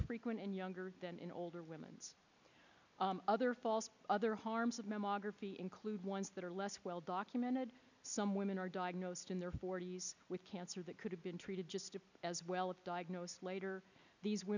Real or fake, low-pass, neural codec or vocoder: real; 7.2 kHz; none